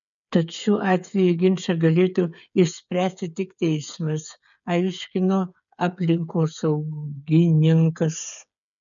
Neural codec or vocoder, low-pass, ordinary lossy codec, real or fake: codec, 16 kHz, 8 kbps, FreqCodec, smaller model; 7.2 kHz; MP3, 96 kbps; fake